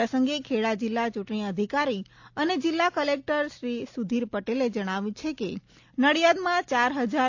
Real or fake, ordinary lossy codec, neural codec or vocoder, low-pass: real; AAC, 48 kbps; none; 7.2 kHz